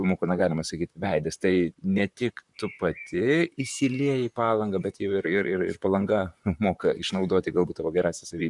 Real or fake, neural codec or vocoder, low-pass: fake; vocoder, 44.1 kHz, 128 mel bands, Pupu-Vocoder; 10.8 kHz